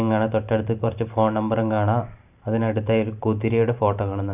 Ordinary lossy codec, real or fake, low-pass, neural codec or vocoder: none; fake; 3.6 kHz; vocoder, 44.1 kHz, 128 mel bands every 512 samples, BigVGAN v2